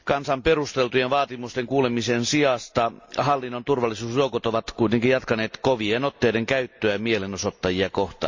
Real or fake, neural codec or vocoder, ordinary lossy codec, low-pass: real; none; MP3, 64 kbps; 7.2 kHz